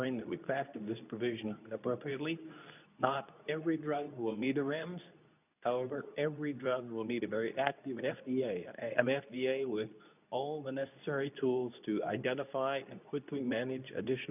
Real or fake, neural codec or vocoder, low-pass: fake; codec, 24 kHz, 0.9 kbps, WavTokenizer, medium speech release version 2; 3.6 kHz